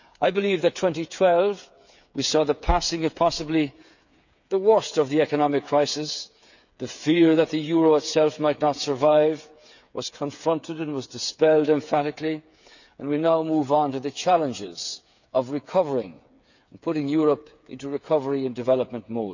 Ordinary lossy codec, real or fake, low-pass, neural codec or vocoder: none; fake; 7.2 kHz; codec, 16 kHz, 8 kbps, FreqCodec, smaller model